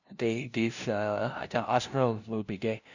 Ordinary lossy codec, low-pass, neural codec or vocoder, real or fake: none; 7.2 kHz; codec, 16 kHz, 0.5 kbps, FunCodec, trained on LibriTTS, 25 frames a second; fake